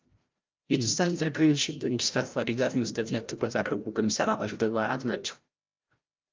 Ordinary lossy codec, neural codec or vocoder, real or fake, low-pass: Opus, 24 kbps; codec, 16 kHz, 0.5 kbps, FreqCodec, larger model; fake; 7.2 kHz